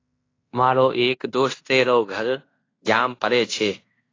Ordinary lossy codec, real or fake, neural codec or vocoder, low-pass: AAC, 32 kbps; fake; codec, 16 kHz in and 24 kHz out, 0.9 kbps, LongCat-Audio-Codec, fine tuned four codebook decoder; 7.2 kHz